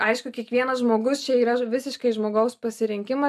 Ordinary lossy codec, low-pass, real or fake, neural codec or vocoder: AAC, 96 kbps; 14.4 kHz; real; none